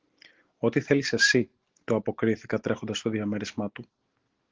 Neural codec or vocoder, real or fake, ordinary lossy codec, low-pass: none; real; Opus, 16 kbps; 7.2 kHz